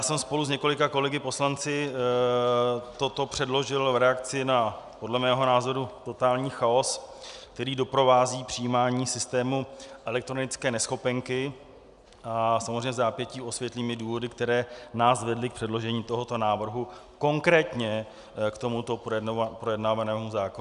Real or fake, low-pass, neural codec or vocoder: real; 10.8 kHz; none